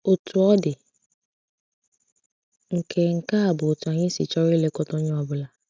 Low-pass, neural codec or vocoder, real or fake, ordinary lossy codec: none; none; real; none